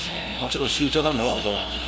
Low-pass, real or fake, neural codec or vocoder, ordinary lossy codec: none; fake; codec, 16 kHz, 0.5 kbps, FunCodec, trained on LibriTTS, 25 frames a second; none